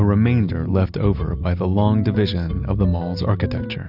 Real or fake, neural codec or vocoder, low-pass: real; none; 5.4 kHz